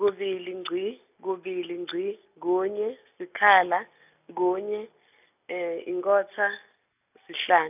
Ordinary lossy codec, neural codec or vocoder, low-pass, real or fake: none; none; 3.6 kHz; real